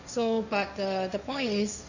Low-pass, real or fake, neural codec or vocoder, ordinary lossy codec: 7.2 kHz; fake; codec, 16 kHz, 1.1 kbps, Voila-Tokenizer; none